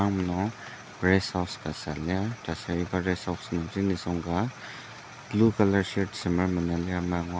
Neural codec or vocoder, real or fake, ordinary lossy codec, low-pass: none; real; none; none